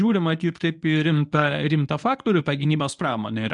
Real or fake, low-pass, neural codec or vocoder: fake; 10.8 kHz; codec, 24 kHz, 0.9 kbps, WavTokenizer, medium speech release version 1